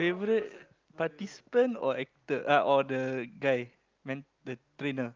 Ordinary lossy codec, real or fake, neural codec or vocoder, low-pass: Opus, 24 kbps; real; none; 7.2 kHz